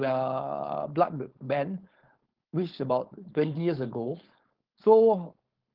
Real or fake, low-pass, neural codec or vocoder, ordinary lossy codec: fake; 5.4 kHz; codec, 16 kHz, 4.8 kbps, FACodec; Opus, 16 kbps